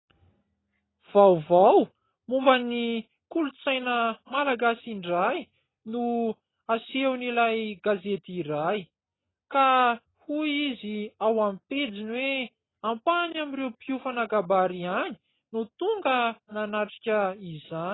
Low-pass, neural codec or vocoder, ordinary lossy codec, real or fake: 7.2 kHz; none; AAC, 16 kbps; real